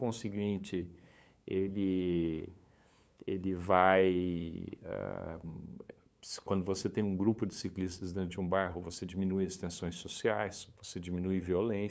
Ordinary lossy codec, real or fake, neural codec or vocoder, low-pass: none; fake; codec, 16 kHz, 8 kbps, FunCodec, trained on LibriTTS, 25 frames a second; none